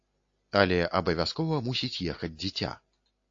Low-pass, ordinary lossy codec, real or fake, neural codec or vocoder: 7.2 kHz; AAC, 48 kbps; real; none